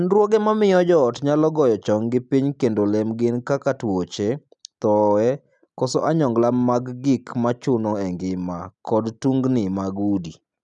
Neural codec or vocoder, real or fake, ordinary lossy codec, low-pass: none; real; none; 10.8 kHz